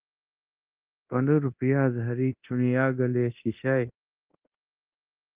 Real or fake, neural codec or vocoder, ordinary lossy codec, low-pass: fake; codec, 24 kHz, 0.9 kbps, DualCodec; Opus, 32 kbps; 3.6 kHz